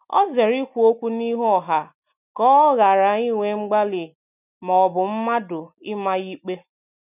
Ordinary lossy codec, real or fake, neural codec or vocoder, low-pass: none; real; none; 3.6 kHz